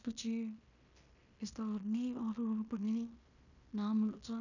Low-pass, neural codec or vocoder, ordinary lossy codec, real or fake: 7.2 kHz; codec, 16 kHz in and 24 kHz out, 0.9 kbps, LongCat-Audio-Codec, fine tuned four codebook decoder; none; fake